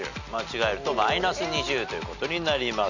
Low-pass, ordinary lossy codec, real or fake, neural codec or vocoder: 7.2 kHz; none; real; none